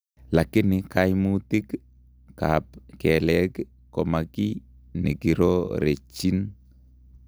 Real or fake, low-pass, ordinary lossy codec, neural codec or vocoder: real; none; none; none